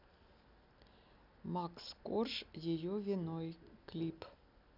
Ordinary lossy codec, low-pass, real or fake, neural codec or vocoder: none; 5.4 kHz; real; none